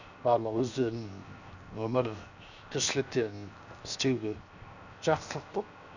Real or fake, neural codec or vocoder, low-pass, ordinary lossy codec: fake; codec, 16 kHz, 0.7 kbps, FocalCodec; 7.2 kHz; none